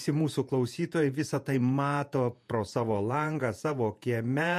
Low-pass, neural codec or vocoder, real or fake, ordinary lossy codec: 14.4 kHz; vocoder, 48 kHz, 128 mel bands, Vocos; fake; MP3, 64 kbps